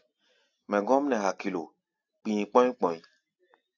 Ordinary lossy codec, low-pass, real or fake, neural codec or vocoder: AAC, 48 kbps; 7.2 kHz; real; none